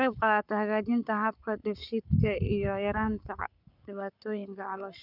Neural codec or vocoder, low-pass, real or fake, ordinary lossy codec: codec, 44.1 kHz, 7.8 kbps, Pupu-Codec; 5.4 kHz; fake; none